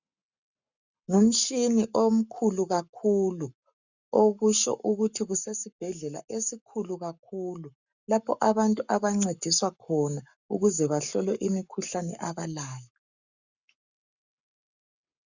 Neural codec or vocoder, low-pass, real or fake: codec, 44.1 kHz, 7.8 kbps, Pupu-Codec; 7.2 kHz; fake